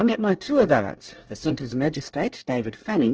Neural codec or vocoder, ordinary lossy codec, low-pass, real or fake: codec, 24 kHz, 1 kbps, SNAC; Opus, 16 kbps; 7.2 kHz; fake